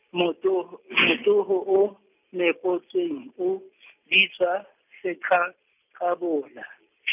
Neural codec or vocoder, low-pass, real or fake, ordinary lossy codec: none; 3.6 kHz; real; none